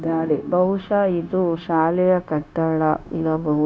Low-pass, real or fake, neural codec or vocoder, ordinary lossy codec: none; fake; codec, 16 kHz, 0.9 kbps, LongCat-Audio-Codec; none